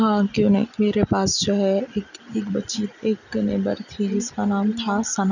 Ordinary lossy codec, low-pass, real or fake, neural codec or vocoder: none; 7.2 kHz; real; none